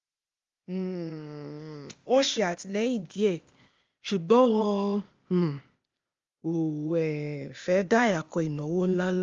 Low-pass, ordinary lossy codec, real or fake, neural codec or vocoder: 7.2 kHz; Opus, 32 kbps; fake; codec, 16 kHz, 0.8 kbps, ZipCodec